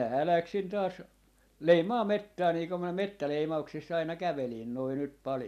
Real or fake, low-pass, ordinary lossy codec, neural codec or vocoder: real; 14.4 kHz; none; none